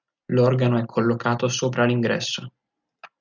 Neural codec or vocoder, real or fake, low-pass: none; real; 7.2 kHz